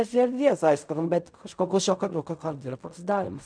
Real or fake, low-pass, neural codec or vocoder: fake; 9.9 kHz; codec, 16 kHz in and 24 kHz out, 0.4 kbps, LongCat-Audio-Codec, fine tuned four codebook decoder